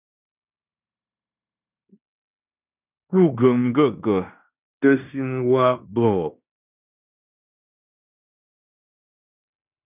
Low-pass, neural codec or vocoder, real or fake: 3.6 kHz; codec, 16 kHz in and 24 kHz out, 0.9 kbps, LongCat-Audio-Codec, fine tuned four codebook decoder; fake